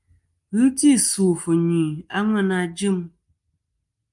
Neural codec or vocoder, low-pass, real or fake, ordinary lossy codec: none; 10.8 kHz; real; Opus, 32 kbps